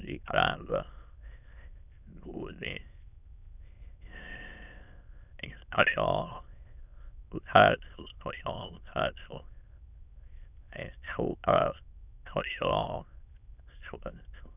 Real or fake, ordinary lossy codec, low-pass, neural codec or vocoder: fake; none; 3.6 kHz; autoencoder, 22.05 kHz, a latent of 192 numbers a frame, VITS, trained on many speakers